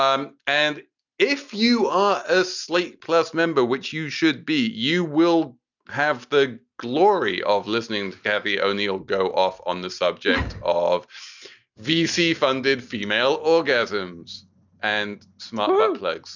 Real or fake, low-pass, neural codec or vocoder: real; 7.2 kHz; none